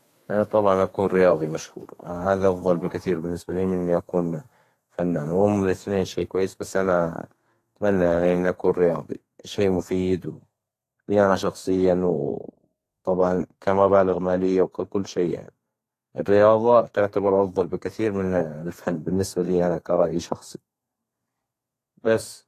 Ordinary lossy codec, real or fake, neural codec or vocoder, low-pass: AAC, 48 kbps; fake; codec, 32 kHz, 1.9 kbps, SNAC; 14.4 kHz